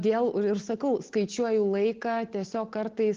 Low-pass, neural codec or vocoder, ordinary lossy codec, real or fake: 7.2 kHz; none; Opus, 16 kbps; real